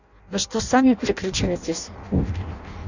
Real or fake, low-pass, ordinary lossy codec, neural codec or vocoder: fake; 7.2 kHz; none; codec, 16 kHz in and 24 kHz out, 0.6 kbps, FireRedTTS-2 codec